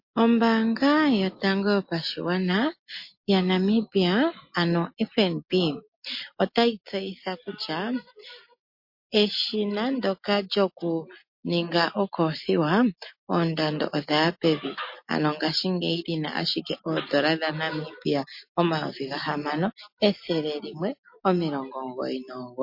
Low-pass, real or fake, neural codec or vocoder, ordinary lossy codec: 5.4 kHz; real; none; MP3, 32 kbps